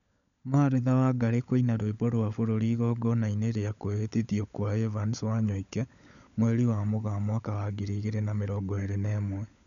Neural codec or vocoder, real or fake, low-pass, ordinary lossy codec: codec, 16 kHz, 16 kbps, FunCodec, trained on LibriTTS, 50 frames a second; fake; 7.2 kHz; none